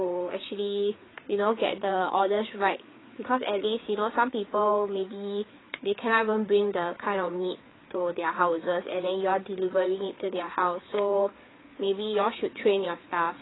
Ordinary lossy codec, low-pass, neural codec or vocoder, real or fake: AAC, 16 kbps; 7.2 kHz; codec, 16 kHz, 4 kbps, FreqCodec, larger model; fake